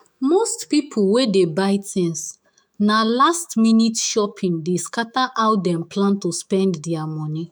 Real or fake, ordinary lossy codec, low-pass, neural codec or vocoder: fake; none; none; autoencoder, 48 kHz, 128 numbers a frame, DAC-VAE, trained on Japanese speech